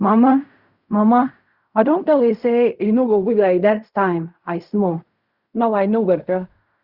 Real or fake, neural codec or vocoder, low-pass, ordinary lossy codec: fake; codec, 16 kHz in and 24 kHz out, 0.4 kbps, LongCat-Audio-Codec, fine tuned four codebook decoder; 5.4 kHz; Opus, 64 kbps